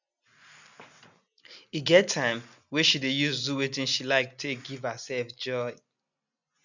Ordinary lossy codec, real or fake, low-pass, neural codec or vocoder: none; real; 7.2 kHz; none